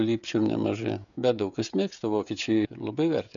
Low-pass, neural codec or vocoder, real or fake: 7.2 kHz; codec, 16 kHz, 16 kbps, FreqCodec, smaller model; fake